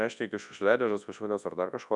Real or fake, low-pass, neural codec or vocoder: fake; 10.8 kHz; codec, 24 kHz, 0.9 kbps, WavTokenizer, large speech release